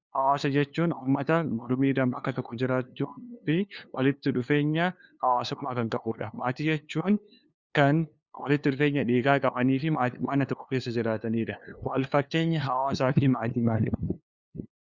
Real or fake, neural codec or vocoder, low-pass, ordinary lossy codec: fake; codec, 16 kHz, 2 kbps, FunCodec, trained on LibriTTS, 25 frames a second; 7.2 kHz; Opus, 64 kbps